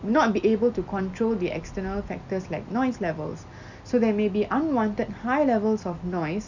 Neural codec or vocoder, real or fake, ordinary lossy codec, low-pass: none; real; none; 7.2 kHz